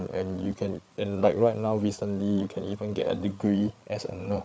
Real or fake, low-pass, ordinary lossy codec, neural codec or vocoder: fake; none; none; codec, 16 kHz, 8 kbps, FreqCodec, larger model